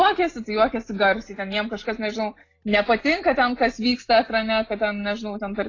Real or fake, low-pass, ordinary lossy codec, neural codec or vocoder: real; 7.2 kHz; AAC, 32 kbps; none